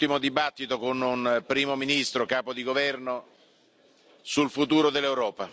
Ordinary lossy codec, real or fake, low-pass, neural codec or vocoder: none; real; none; none